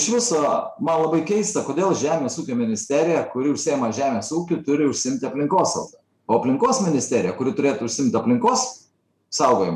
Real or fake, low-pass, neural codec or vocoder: real; 14.4 kHz; none